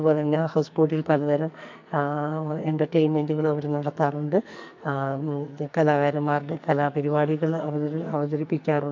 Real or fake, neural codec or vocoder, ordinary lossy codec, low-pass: fake; codec, 44.1 kHz, 2.6 kbps, SNAC; MP3, 64 kbps; 7.2 kHz